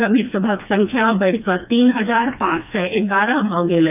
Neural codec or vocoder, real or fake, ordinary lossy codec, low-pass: codec, 16 kHz, 2 kbps, FreqCodec, smaller model; fake; none; 3.6 kHz